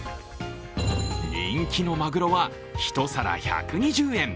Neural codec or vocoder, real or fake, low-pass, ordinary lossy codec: none; real; none; none